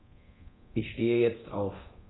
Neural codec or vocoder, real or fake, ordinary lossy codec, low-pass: codec, 24 kHz, 0.9 kbps, DualCodec; fake; AAC, 16 kbps; 7.2 kHz